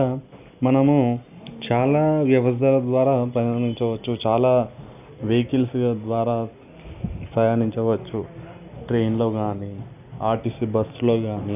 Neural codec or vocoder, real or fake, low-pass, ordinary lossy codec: none; real; 3.6 kHz; none